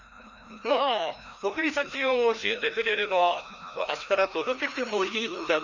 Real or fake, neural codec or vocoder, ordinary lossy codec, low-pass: fake; codec, 16 kHz, 1 kbps, FreqCodec, larger model; none; 7.2 kHz